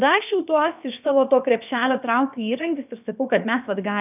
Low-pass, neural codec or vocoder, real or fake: 3.6 kHz; codec, 16 kHz, about 1 kbps, DyCAST, with the encoder's durations; fake